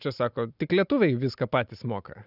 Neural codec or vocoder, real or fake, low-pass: none; real; 5.4 kHz